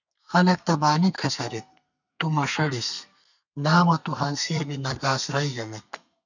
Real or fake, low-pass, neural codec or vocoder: fake; 7.2 kHz; codec, 32 kHz, 1.9 kbps, SNAC